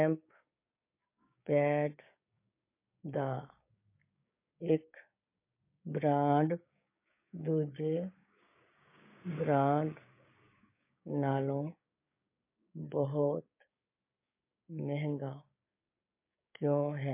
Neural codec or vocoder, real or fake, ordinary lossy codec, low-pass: codec, 16 kHz, 6 kbps, DAC; fake; MP3, 24 kbps; 3.6 kHz